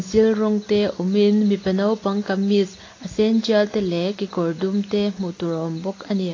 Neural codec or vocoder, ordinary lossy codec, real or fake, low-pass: none; AAC, 32 kbps; real; 7.2 kHz